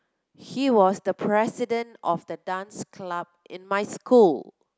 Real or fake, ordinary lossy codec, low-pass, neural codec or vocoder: real; none; none; none